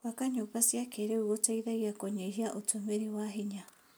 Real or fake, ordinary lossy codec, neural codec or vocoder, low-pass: real; none; none; none